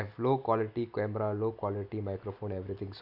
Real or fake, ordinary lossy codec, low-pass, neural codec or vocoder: real; none; 5.4 kHz; none